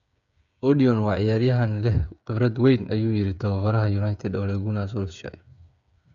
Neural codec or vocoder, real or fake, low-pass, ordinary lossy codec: codec, 16 kHz, 8 kbps, FreqCodec, smaller model; fake; 7.2 kHz; none